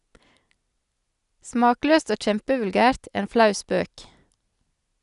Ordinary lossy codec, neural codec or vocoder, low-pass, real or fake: none; none; 10.8 kHz; real